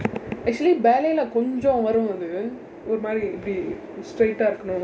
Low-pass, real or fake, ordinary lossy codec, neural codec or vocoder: none; real; none; none